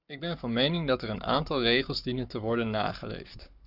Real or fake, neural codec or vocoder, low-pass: fake; codec, 44.1 kHz, 7.8 kbps, Pupu-Codec; 5.4 kHz